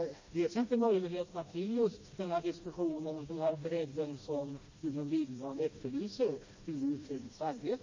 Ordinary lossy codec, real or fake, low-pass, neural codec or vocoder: MP3, 32 kbps; fake; 7.2 kHz; codec, 16 kHz, 1 kbps, FreqCodec, smaller model